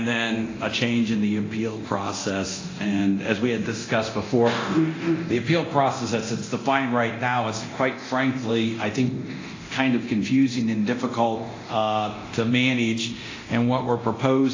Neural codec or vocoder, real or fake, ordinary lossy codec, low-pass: codec, 24 kHz, 0.9 kbps, DualCodec; fake; AAC, 32 kbps; 7.2 kHz